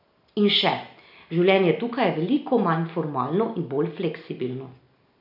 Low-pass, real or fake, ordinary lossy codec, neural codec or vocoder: 5.4 kHz; real; none; none